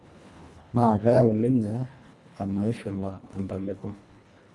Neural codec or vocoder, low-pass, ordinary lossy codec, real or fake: codec, 24 kHz, 1.5 kbps, HILCodec; none; none; fake